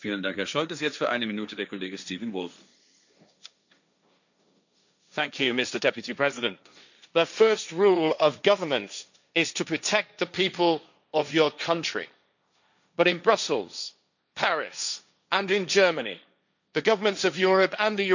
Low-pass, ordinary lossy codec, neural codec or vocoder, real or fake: 7.2 kHz; none; codec, 16 kHz, 1.1 kbps, Voila-Tokenizer; fake